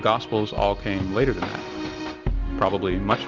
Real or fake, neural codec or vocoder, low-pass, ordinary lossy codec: real; none; 7.2 kHz; Opus, 32 kbps